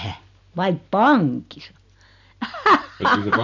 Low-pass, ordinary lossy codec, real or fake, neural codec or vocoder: 7.2 kHz; none; real; none